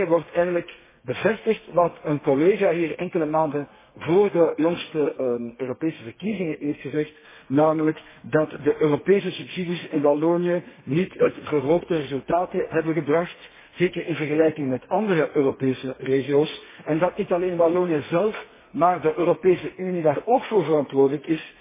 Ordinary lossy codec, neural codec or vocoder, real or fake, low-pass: MP3, 16 kbps; codec, 32 kHz, 1.9 kbps, SNAC; fake; 3.6 kHz